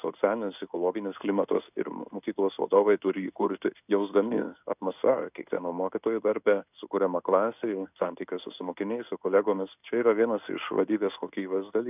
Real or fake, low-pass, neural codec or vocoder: fake; 3.6 kHz; codec, 16 kHz in and 24 kHz out, 1 kbps, XY-Tokenizer